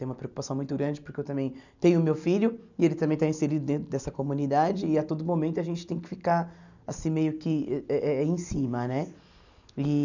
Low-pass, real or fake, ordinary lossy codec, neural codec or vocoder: 7.2 kHz; real; none; none